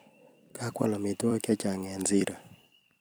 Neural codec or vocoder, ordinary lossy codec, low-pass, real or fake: vocoder, 44.1 kHz, 128 mel bands every 256 samples, BigVGAN v2; none; none; fake